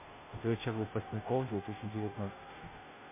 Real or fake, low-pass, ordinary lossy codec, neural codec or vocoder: fake; 3.6 kHz; MP3, 24 kbps; codec, 16 kHz, 0.5 kbps, FunCodec, trained on Chinese and English, 25 frames a second